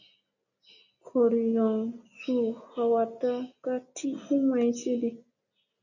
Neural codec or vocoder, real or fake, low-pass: none; real; 7.2 kHz